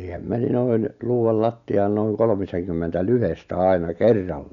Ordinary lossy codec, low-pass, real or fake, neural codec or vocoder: none; 7.2 kHz; real; none